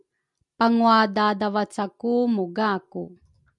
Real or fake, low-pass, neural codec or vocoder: real; 10.8 kHz; none